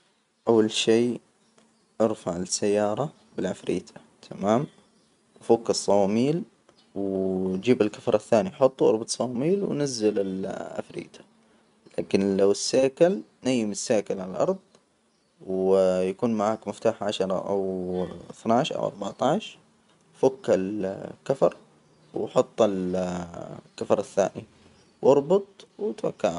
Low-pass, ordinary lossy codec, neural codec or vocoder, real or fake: 10.8 kHz; none; none; real